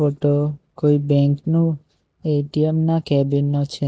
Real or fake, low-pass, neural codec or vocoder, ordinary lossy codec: real; none; none; none